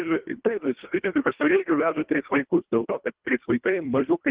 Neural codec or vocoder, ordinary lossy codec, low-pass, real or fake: codec, 24 kHz, 1.5 kbps, HILCodec; Opus, 16 kbps; 3.6 kHz; fake